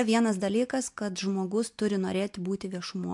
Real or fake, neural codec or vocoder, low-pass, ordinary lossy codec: real; none; 10.8 kHz; MP3, 64 kbps